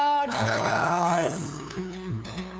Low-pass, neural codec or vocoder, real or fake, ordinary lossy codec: none; codec, 16 kHz, 2 kbps, FunCodec, trained on LibriTTS, 25 frames a second; fake; none